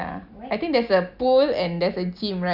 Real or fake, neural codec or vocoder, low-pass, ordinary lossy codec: real; none; 5.4 kHz; none